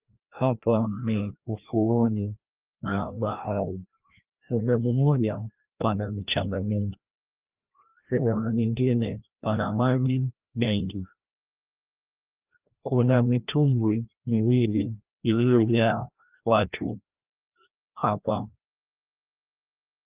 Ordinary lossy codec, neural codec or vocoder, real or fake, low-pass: Opus, 24 kbps; codec, 16 kHz, 1 kbps, FreqCodec, larger model; fake; 3.6 kHz